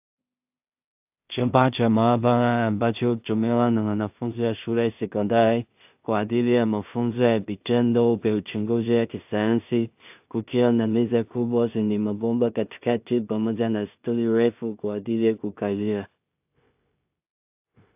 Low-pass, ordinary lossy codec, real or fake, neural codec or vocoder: 3.6 kHz; AAC, 32 kbps; fake; codec, 16 kHz in and 24 kHz out, 0.4 kbps, LongCat-Audio-Codec, two codebook decoder